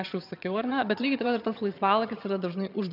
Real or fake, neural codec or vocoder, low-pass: fake; vocoder, 22.05 kHz, 80 mel bands, HiFi-GAN; 5.4 kHz